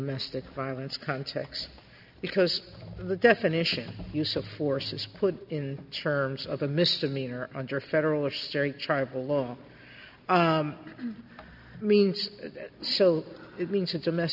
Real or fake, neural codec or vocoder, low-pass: real; none; 5.4 kHz